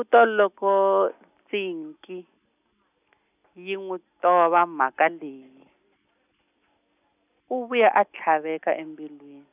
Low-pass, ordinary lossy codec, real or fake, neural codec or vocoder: 3.6 kHz; none; real; none